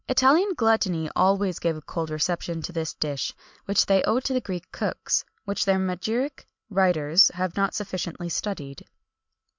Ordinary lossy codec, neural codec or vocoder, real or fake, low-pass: MP3, 64 kbps; none; real; 7.2 kHz